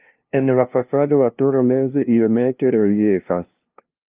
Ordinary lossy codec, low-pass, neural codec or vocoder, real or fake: Opus, 24 kbps; 3.6 kHz; codec, 16 kHz, 0.5 kbps, FunCodec, trained on LibriTTS, 25 frames a second; fake